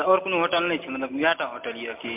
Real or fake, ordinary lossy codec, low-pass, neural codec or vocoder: real; MP3, 32 kbps; 3.6 kHz; none